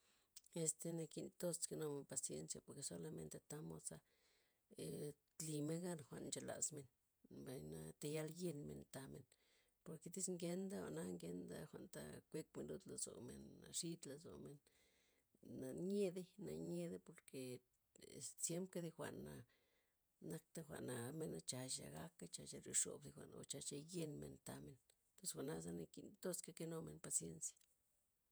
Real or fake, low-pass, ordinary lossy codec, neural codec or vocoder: fake; none; none; vocoder, 48 kHz, 128 mel bands, Vocos